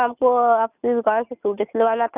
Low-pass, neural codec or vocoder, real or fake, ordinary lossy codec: 3.6 kHz; none; real; none